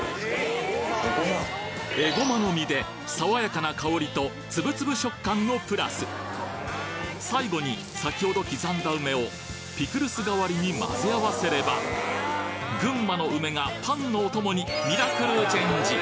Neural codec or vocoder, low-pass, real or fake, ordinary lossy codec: none; none; real; none